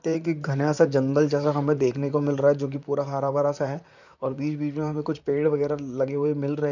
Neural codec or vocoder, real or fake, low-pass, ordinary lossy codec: vocoder, 44.1 kHz, 128 mel bands, Pupu-Vocoder; fake; 7.2 kHz; none